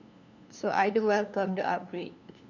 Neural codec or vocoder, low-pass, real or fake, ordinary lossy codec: codec, 16 kHz, 2 kbps, FunCodec, trained on LibriTTS, 25 frames a second; 7.2 kHz; fake; none